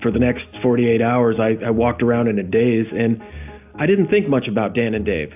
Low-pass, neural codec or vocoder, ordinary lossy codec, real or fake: 3.6 kHz; none; AAC, 32 kbps; real